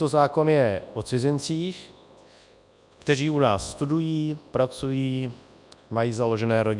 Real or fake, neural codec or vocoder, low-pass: fake; codec, 24 kHz, 0.9 kbps, WavTokenizer, large speech release; 10.8 kHz